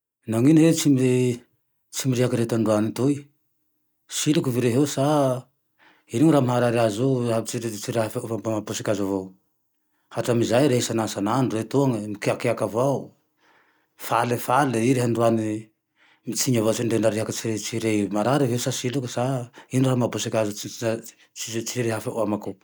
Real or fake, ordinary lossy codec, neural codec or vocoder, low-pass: real; none; none; none